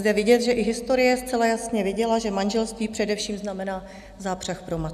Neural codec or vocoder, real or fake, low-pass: none; real; 14.4 kHz